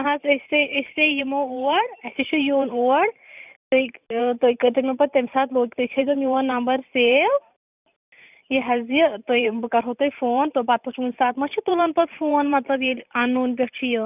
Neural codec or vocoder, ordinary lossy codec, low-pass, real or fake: vocoder, 44.1 kHz, 128 mel bands every 512 samples, BigVGAN v2; none; 3.6 kHz; fake